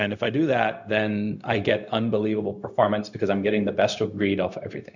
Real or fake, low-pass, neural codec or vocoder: fake; 7.2 kHz; codec, 16 kHz, 0.4 kbps, LongCat-Audio-Codec